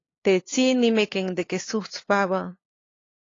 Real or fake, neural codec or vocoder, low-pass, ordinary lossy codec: fake; codec, 16 kHz, 2 kbps, FunCodec, trained on LibriTTS, 25 frames a second; 7.2 kHz; AAC, 32 kbps